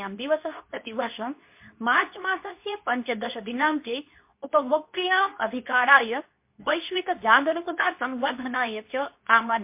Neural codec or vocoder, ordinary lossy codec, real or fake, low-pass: codec, 24 kHz, 0.9 kbps, WavTokenizer, medium speech release version 2; MP3, 32 kbps; fake; 3.6 kHz